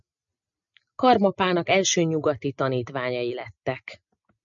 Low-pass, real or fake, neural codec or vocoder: 7.2 kHz; real; none